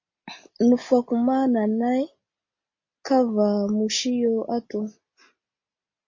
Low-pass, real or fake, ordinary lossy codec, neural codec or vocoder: 7.2 kHz; real; MP3, 32 kbps; none